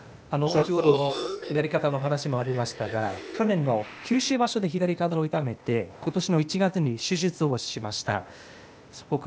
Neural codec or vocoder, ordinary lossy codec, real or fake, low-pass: codec, 16 kHz, 0.8 kbps, ZipCodec; none; fake; none